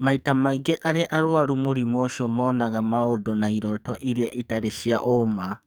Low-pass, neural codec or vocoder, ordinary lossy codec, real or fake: none; codec, 44.1 kHz, 2.6 kbps, SNAC; none; fake